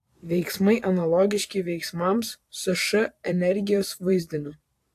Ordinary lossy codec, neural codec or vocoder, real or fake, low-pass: AAC, 64 kbps; vocoder, 44.1 kHz, 128 mel bands every 512 samples, BigVGAN v2; fake; 14.4 kHz